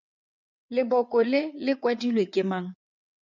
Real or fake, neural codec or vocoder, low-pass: fake; vocoder, 22.05 kHz, 80 mel bands, WaveNeXt; 7.2 kHz